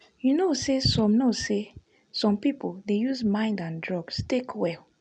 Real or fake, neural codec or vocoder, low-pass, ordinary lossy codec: real; none; 9.9 kHz; none